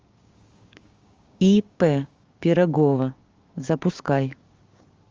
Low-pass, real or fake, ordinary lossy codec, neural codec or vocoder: 7.2 kHz; fake; Opus, 32 kbps; codec, 16 kHz, 6 kbps, DAC